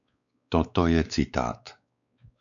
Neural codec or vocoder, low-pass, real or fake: codec, 16 kHz, 4 kbps, X-Codec, WavLM features, trained on Multilingual LibriSpeech; 7.2 kHz; fake